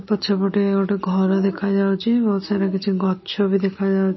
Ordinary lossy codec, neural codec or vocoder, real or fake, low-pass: MP3, 24 kbps; none; real; 7.2 kHz